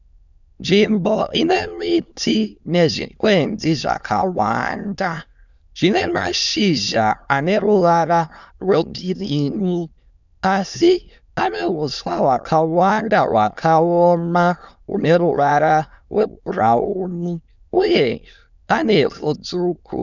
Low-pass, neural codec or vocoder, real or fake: 7.2 kHz; autoencoder, 22.05 kHz, a latent of 192 numbers a frame, VITS, trained on many speakers; fake